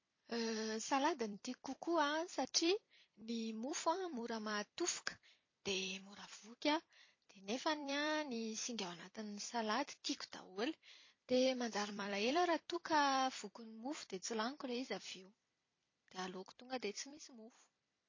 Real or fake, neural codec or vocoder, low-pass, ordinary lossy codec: fake; vocoder, 44.1 kHz, 128 mel bands every 256 samples, BigVGAN v2; 7.2 kHz; MP3, 32 kbps